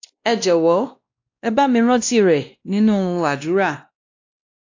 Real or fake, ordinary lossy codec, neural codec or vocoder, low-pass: fake; AAC, 48 kbps; codec, 16 kHz, 1 kbps, X-Codec, WavLM features, trained on Multilingual LibriSpeech; 7.2 kHz